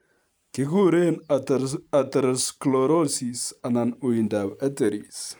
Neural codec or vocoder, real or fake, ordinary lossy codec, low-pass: vocoder, 44.1 kHz, 128 mel bands every 512 samples, BigVGAN v2; fake; none; none